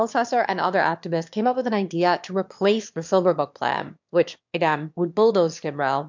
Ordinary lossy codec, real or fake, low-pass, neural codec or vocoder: MP3, 64 kbps; fake; 7.2 kHz; autoencoder, 22.05 kHz, a latent of 192 numbers a frame, VITS, trained on one speaker